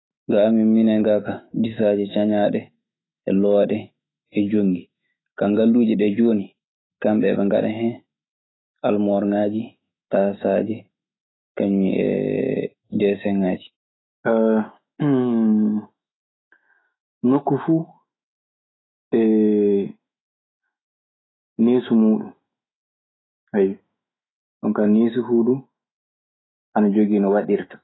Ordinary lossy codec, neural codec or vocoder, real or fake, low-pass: AAC, 16 kbps; autoencoder, 48 kHz, 128 numbers a frame, DAC-VAE, trained on Japanese speech; fake; 7.2 kHz